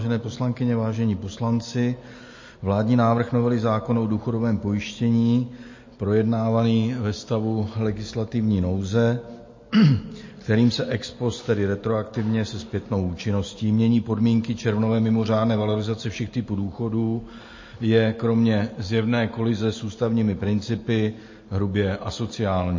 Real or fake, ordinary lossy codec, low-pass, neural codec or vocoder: real; MP3, 32 kbps; 7.2 kHz; none